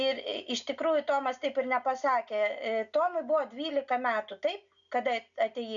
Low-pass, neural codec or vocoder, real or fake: 7.2 kHz; none; real